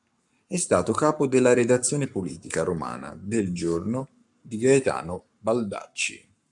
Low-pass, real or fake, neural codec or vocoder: 10.8 kHz; fake; codec, 44.1 kHz, 7.8 kbps, Pupu-Codec